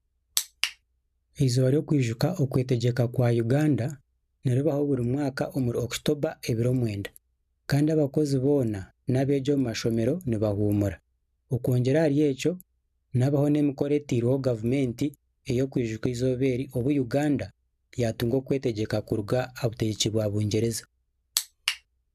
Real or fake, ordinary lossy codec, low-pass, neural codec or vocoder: real; none; 14.4 kHz; none